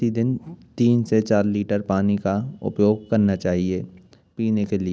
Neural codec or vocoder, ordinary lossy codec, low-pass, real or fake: none; none; none; real